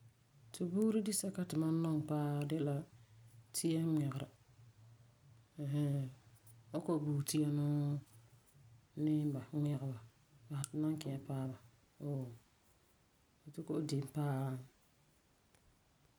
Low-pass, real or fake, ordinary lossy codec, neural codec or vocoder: none; real; none; none